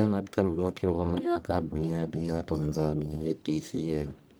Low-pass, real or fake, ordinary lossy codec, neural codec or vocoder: none; fake; none; codec, 44.1 kHz, 1.7 kbps, Pupu-Codec